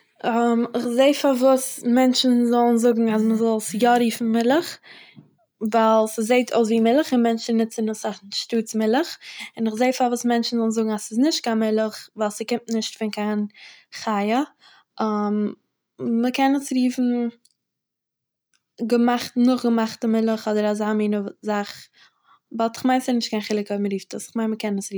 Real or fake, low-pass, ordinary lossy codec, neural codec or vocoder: real; none; none; none